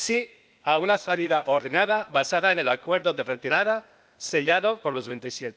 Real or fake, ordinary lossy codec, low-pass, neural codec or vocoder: fake; none; none; codec, 16 kHz, 0.8 kbps, ZipCodec